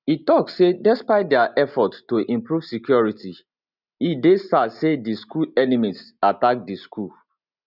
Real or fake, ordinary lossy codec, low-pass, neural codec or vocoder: real; none; 5.4 kHz; none